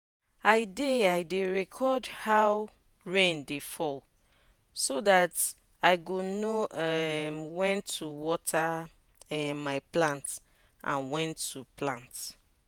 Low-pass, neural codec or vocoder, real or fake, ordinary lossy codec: none; vocoder, 48 kHz, 128 mel bands, Vocos; fake; none